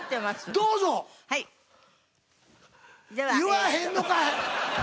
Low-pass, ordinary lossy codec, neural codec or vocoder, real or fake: none; none; none; real